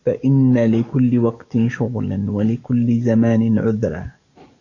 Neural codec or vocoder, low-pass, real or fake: codec, 44.1 kHz, 7.8 kbps, DAC; 7.2 kHz; fake